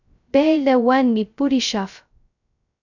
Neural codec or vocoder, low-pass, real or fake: codec, 16 kHz, 0.2 kbps, FocalCodec; 7.2 kHz; fake